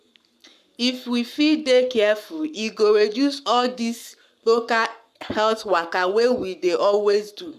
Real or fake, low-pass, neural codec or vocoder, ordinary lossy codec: fake; 14.4 kHz; codec, 44.1 kHz, 7.8 kbps, Pupu-Codec; none